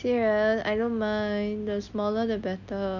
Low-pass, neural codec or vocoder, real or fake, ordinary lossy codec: 7.2 kHz; none; real; none